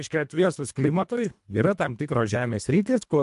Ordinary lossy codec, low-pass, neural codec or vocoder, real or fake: MP3, 64 kbps; 10.8 kHz; codec, 24 kHz, 1.5 kbps, HILCodec; fake